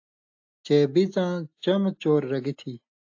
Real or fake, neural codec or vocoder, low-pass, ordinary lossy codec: real; none; 7.2 kHz; AAC, 48 kbps